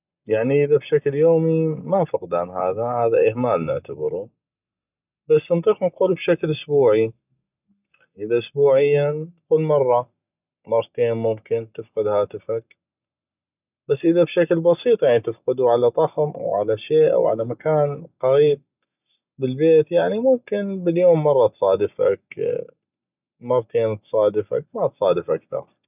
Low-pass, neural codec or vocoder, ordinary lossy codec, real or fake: 3.6 kHz; none; none; real